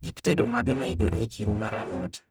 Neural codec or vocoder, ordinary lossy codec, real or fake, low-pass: codec, 44.1 kHz, 0.9 kbps, DAC; none; fake; none